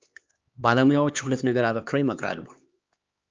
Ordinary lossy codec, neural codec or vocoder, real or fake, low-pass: Opus, 24 kbps; codec, 16 kHz, 2 kbps, X-Codec, HuBERT features, trained on LibriSpeech; fake; 7.2 kHz